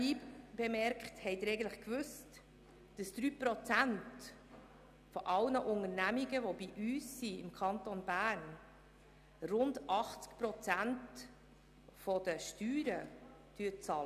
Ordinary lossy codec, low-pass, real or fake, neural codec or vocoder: none; 14.4 kHz; real; none